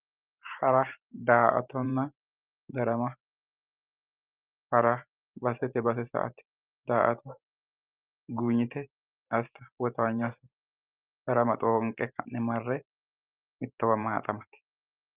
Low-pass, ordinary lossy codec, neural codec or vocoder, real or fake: 3.6 kHz; Opus, 32 kbps; vocoder, 44.1 kHz, 128 mel bands every 512 samples, BigVGAN v2; fake